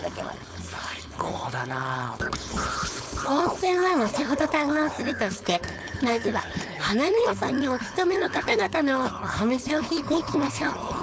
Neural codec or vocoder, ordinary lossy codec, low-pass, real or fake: codec, 16 kHz, 4.8 kbps, FACodec; none; none; fake